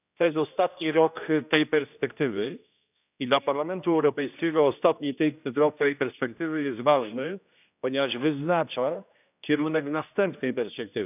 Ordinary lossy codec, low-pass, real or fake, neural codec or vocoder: none; 3.6 kHz; fake; codec, 16 kHz, 1 kbps, X-Codec, HuBERT features, trained on general audio